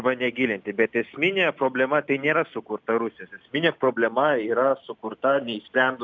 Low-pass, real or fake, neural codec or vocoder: 7.2 kHz; real; none